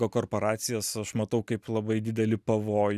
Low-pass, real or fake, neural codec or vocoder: 14.4 kHz; real; none